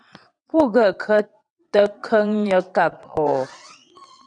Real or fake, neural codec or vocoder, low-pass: fake; vocoder, 22.05 kHz, 80 mel bands, WaveNeXt; 9.9 kHz